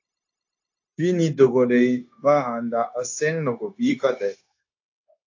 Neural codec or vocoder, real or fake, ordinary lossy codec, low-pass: codec, 16 kHz, 0.9 kbps, LongCat-Audio-Codec; fake; AAC, 48 kbps; 7.2 kHz